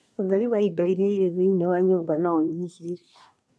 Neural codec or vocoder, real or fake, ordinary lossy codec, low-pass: codec, 24 kHz, 1 kbps, SNAC; fake; none; none